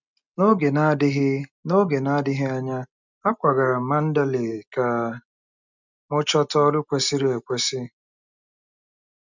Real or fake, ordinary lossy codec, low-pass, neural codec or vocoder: real; none; 7.2 kHz; none